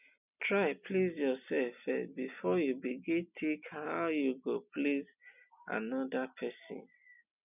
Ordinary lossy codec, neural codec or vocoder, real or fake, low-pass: none; none; real; 3.6 kHz